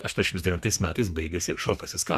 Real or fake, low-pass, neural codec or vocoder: fake; 14.4 kHz; codec, 32 kHz, 1.9 kbps, SNAC